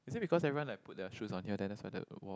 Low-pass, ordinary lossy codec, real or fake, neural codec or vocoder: none; none; real; none